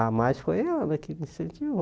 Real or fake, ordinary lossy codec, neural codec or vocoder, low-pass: real; none; none; none